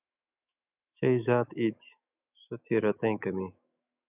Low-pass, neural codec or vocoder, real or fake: 3.6 kHz; autoencoder, 48 kHz, 128 numbers a frame, DAC-VAE, trained on Japanese speech; fake